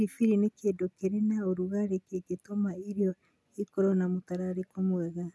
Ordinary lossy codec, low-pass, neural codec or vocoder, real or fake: none; none; none; real